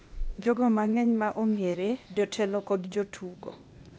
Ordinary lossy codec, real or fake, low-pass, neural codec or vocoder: none; fake; none; codec, 16 kHz, 0.8 kbps, ZipCodec